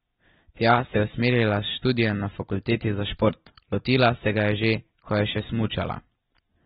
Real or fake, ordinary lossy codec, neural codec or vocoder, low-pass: real; AAC, 16 kbps; none; 19.8 kHz